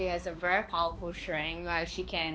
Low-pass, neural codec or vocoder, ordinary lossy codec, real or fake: none; codec, 16 kHz, 2 kbps, X-Codec, HuBERT features, trained on balanced general audio; none; fake